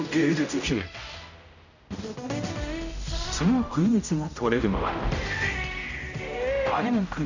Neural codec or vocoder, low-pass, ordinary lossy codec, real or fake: codec, 16 kHz, 0.5 kbps, X-Codec, HuBERT features, trained on general audio; 7.2 kHz; none; fake